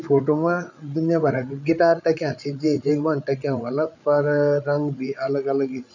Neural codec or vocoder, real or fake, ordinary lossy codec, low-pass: codec, 16 kHz, 8 kbps, FreqCodec, larger model; fake; none; 7.2 kHz